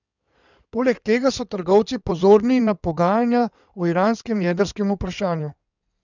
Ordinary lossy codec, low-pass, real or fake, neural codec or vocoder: none; 7.2 kHz; fake; codec, 16 kHz in and 24 kHz out, 2.2 kbps, FireRedTTS-2 codec